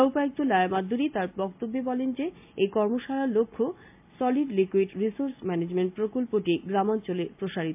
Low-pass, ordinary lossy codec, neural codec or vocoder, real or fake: 3.6 kHz; MP3, 32 kbps; none; real